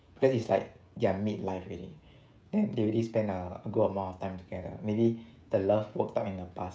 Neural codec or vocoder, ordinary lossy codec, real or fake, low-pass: codec, 16 kHz, 16 kbps, FreqCodec, smaller model; none; fake; none